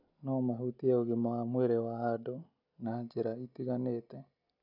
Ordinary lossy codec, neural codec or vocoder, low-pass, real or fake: none; none; 5.4 kHz; real